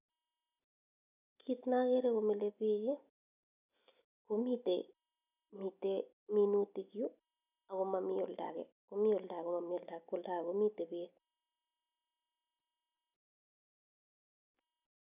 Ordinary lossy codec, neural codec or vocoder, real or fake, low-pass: none; none; real; 3.6 kHz